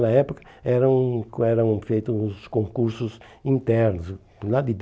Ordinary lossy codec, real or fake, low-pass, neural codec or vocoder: none; real; none; none